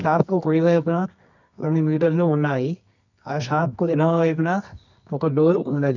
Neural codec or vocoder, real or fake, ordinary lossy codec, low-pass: codec, 24 kHz, 0.9 kbps, WavTokenizer, medium music audio release; fake; none; 7.2 kHz